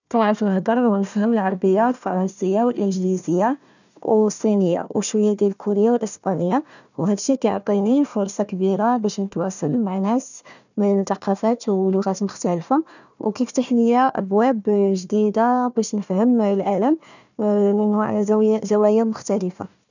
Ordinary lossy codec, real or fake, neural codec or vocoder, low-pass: none; fake; codec, 16 kHz, 1 kbps, FunCodec, trained on Chinese and English, 50 frames a second; 7.2 kHz